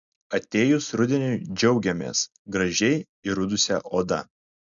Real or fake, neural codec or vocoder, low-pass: real; none; 7.2 kHz